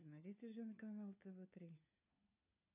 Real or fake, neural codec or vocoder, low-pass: fake; codec, 16 kHz, 4 kbps, FunCodec, trained on LibriTTS, 50 frames a second; 3.6 kHz